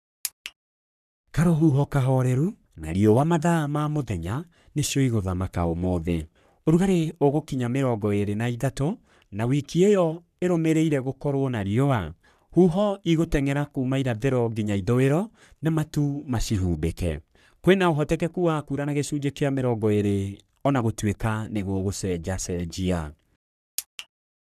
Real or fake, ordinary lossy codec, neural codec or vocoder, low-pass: fake; none; codec, 44.1 kHz, 3.4 kbps, Pupu-Codec; 14.4 kHz